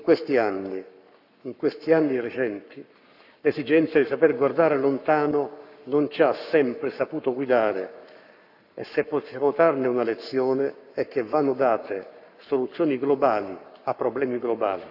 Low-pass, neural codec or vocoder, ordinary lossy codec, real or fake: 5.4 kHz; codec, 44.1 kHz, 7.8 kbps, Pupu-Codec; none; fake